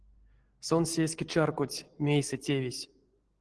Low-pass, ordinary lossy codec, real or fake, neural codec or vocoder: 9.9 kHz; Opus, 16 kbps; real; none